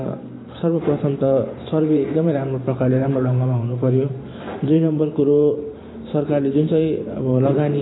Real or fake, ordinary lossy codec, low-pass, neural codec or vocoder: fake; AAC, 16 kbps; 7.2 kHz; vocoder, 44.1 kHz, 128 mel bands every 512 samples, BigVGAN v2